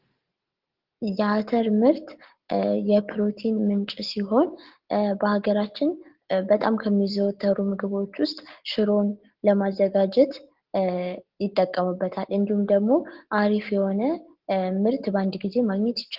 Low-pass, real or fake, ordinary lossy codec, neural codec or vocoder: 5.4 kHz; real; Opus, 16 kbps; none